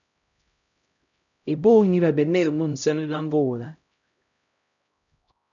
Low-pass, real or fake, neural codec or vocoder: 7.2 kHz; fake; codec, 16 kHz, 0.5 kbps, X-Codec, HuBERT features, trained on LibriSpeech